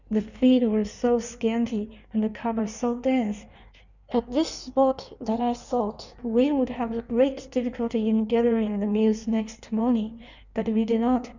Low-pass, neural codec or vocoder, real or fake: 7.2 kHz; codec, 16 kHz in and 24 kHz out, 1.1 kbps, FireRedTTS-2 codec; fake